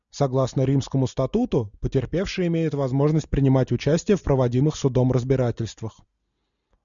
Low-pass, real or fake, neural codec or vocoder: 7.2 kHz; real; none